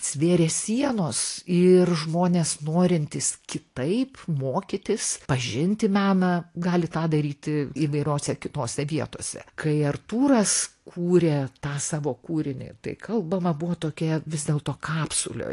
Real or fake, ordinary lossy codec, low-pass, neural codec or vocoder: real; AAC, 48 kbps; 10.8 kHz; none